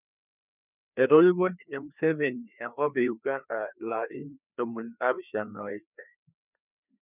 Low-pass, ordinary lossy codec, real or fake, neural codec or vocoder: 3.6 kHz; none; fake; codec, 16 kHz, 2 kbps, FreqCodec, larger model